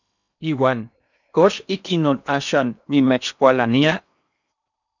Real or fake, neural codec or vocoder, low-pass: fake; codec, 16 kHz in and 24 kHz out, 0.8 kbps, FocalCodec, streaming, 65536 codes; 7.2 kHz